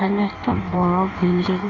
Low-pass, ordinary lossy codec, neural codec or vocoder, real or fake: 7.2 kHz; none; codec, 16 kHz in and 24 kHz out, 1.1 kbps, FireRedTTS-2 codec; fake